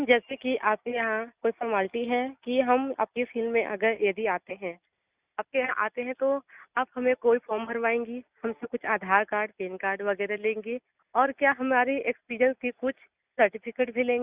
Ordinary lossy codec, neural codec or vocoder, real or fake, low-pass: Opus, 64 kbps; none; real; 3.6 kHz